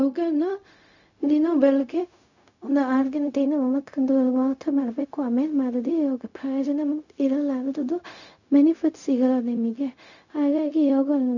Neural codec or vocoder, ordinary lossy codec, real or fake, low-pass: codec, 16 kHz, 0.4 kbps, LongCat-Audio-Codec; none; fake; 7.2 kHz